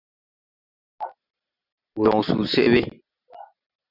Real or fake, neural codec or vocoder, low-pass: real; none; 5.4 kHz